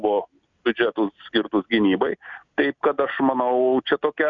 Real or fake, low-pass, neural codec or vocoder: real; 7.2 kHz; none